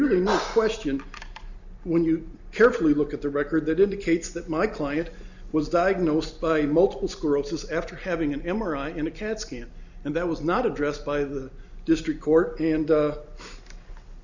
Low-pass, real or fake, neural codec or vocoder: 7.2 kHz; real; none